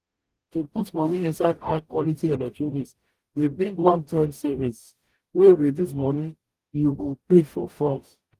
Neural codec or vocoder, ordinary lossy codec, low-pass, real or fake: codec, 44.1 kHz, 0.9 kbps, DAC; Opus, 24 kbps; 14.4 kHz; fake